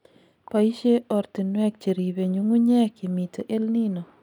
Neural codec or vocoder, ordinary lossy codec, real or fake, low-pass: none; none; real; 19.8 kHz